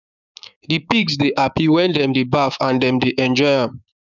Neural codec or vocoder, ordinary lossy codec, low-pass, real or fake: codec, 16 kHz, 6 kbps, DAC; none; 7.2 kHz; fake